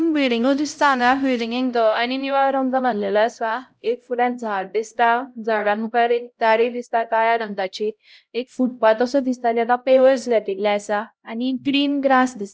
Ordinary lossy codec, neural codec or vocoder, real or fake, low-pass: none; codec, 16 kHz, 0.5 kbps, X-Codec, HuBERT features, trained on LibriSpeech; fake; none